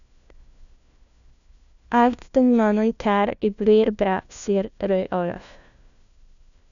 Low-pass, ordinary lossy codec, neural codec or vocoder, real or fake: 7.2 kHz; none; codec, 16 kHz, 1 kbps, FunCodec, trained on LibriTTS, 50 frames a second; fake